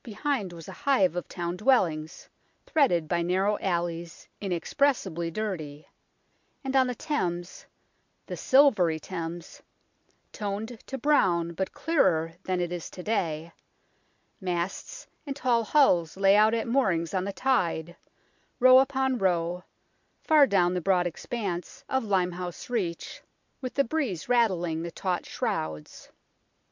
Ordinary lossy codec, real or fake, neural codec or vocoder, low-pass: MP3, 64 kbps; real; none; 7.2 kHz